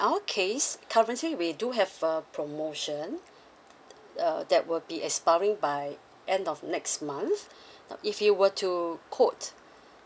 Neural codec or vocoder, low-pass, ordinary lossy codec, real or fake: none; none; none; real